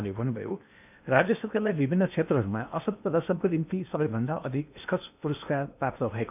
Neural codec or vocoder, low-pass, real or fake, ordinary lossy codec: codec, 16 kHz in and 24 kHz out, 0.8 kbps, FocalCodec, streaming, 65536 codes; 3.6 kHz; fake; none